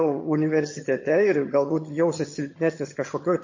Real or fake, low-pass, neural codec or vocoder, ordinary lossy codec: fake; 7.2 kHz; vocoder, 22.05 kHz, 80 mel bands, HiFi-GAN; MP3, 32 kbps